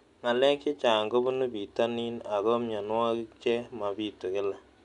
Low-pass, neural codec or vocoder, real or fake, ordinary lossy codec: 10.8 kHz; none; real; none